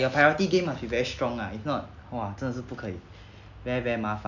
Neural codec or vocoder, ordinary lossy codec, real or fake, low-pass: none; none; real; 7.2 kHz